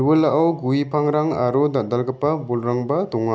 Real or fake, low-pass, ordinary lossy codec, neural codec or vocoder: real; none; none; none